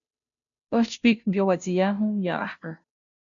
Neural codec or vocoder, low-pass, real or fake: codec, 16 kHz, 0.5 kbps, FunCodec, trained on Chinese and English, 25 frames a second; 7.2 kHz; fake